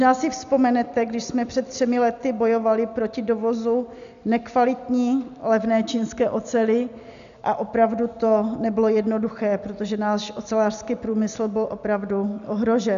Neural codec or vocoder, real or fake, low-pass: none; real; 7.2 kHz